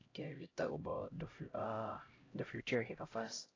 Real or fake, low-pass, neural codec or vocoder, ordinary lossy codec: fake; 7.2 kHz; codec, 16 kHz, 0.5 kbps, X-Codec, HuBERT features, trained on LibriSpeech; AAC, 32 kbps